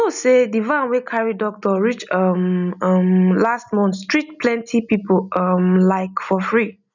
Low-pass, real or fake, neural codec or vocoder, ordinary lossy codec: 7.2 kHz; real; none; none